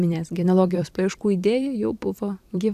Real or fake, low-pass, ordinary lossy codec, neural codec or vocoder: fake; 14.4 kHz; AAC, 96 kbps; vocoder, 44.1 kHz, 128 mel bands every 256 samples, BigVGAN v2